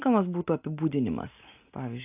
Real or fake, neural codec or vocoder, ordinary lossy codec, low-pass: real; none; AAC, 32 kbps; 3.6 kHz